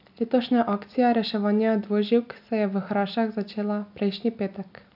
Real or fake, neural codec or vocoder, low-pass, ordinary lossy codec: real; none; 5.4 kHz; AAC, 48 kbps